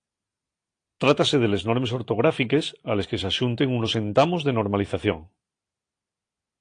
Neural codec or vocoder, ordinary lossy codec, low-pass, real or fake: none; AAC, 48 kbps; 9.9 kHz; real